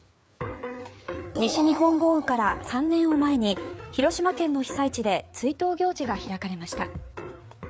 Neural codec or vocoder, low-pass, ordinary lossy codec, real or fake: codec, 16 kHz, 4 kbps, FreqCodec, larger model; none; none; fake